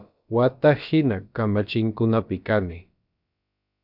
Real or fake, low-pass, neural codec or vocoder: fake; 5.4 kHz; codec, 16 kHz, about 1 kbps, DyCAST, with the encoder's durations